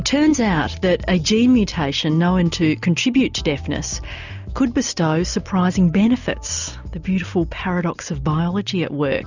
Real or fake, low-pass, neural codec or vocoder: real; 7.2 kHz; none